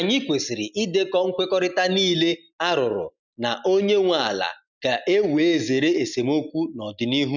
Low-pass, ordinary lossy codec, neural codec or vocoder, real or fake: 7.2 kHz; none; none; real